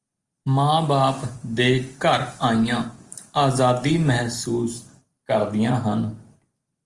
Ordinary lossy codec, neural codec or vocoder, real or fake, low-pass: Opus, 24 kbps; none; real; 10.8 kHz